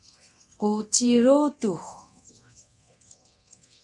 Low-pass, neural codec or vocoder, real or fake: 10.8 kHz; codec, 24 kHz, 0.9 kbps, DualCodec; fake